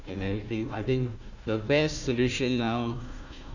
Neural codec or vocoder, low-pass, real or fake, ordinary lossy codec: codec, 16 kHz, 1 kbps, FunCodec, trained on Chinese and English, 50 frames a second; 7.2 kHz; fake; none